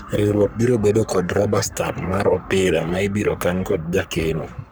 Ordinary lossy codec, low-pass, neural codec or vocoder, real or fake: none; none; codec, 44.1 kHz, 3.4 kbps, Pupu-Codec; fake